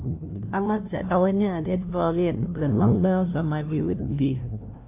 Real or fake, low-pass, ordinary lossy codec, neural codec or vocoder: fake; 3.6 kHz; AAC, 24 kbps; codec, 16 kHz, 0.5 kbps, FunCodec, trained on LibriTTS, 25 frames a second